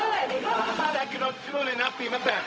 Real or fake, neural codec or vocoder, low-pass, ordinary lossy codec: fake; codec, 16 kHz, 0.4 kbps, LongCat-Audio-Codec; none; none